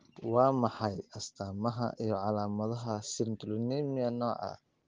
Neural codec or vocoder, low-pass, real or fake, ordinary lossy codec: none; 7.2 kHz; real; Opus, 16 kbps